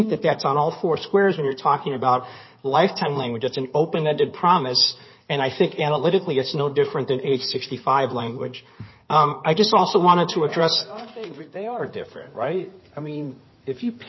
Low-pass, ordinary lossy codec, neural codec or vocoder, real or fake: 7.2 kHz; MP3, 24 kbps; codec, 16 kHz in and 24 kHz out, 2.2 kbps, FireRedTTS-2 codec; fake